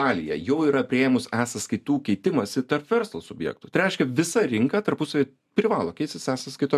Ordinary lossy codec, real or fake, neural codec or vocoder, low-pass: MP3, 96 kbps; real; none; 14.4 kHz